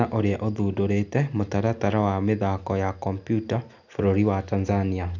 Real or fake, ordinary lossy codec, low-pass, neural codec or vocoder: real; none; 7.2 kHz; none